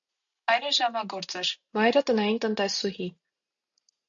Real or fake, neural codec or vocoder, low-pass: real; none; 7.2 kHz